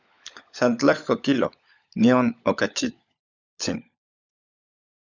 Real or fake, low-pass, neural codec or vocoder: fake; 7.2 kHz; codec, 16 kHz, 16 kbps, FunCodec, trained on LibriTTS, 50 frames a second